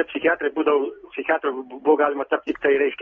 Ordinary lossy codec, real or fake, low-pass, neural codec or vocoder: AAC, 24 kbps; real; 7.2 kHz; none